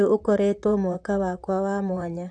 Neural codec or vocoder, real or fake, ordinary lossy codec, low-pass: vocoder, 44.1 kHz, 128 mel bands, Pupu-Vocoder; fake; AAC, 64 kbps; 10.8 kHz